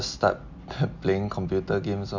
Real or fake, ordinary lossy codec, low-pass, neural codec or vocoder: real; MP3, 64 kbps; 7.2 kHz; none